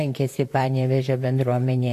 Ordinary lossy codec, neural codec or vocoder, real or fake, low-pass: AAC, 64 kbps; vocoder, 44.1 kHz, 128 mel bands, Pupu-Vocoder; fake; 14.4 kHz